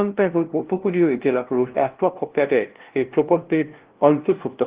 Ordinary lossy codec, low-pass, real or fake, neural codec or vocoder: Opus, 16 kbps; 3.6 kHz; fake; codec, 16 kHz, 0.5 kbps, FunCodec, trained on LibriTTS, 25 frames a second